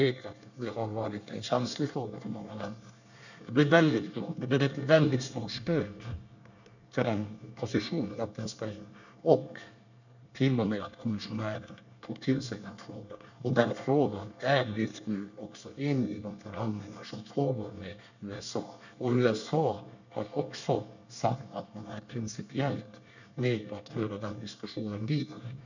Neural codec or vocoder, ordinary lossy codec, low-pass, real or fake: codec, 24 kHz, 1 kbps, SNAC; none; 7.2 kHz; fake